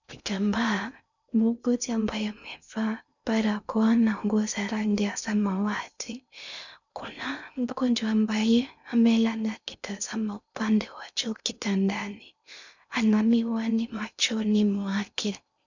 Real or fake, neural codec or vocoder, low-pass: fake; codec, 16 kHz in and 24 kHz out, 0.6 kbps, FocalCodec, streaming, 2048 codes; 7.2 kHz